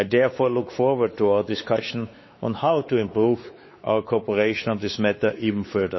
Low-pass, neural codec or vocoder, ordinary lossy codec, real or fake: 7.2 kHz; codec, 16 kHz, 4 kbps, X-Codec, WavLM features, trained on Multilingual LibriSpeech; MP3, 24 kbps; fake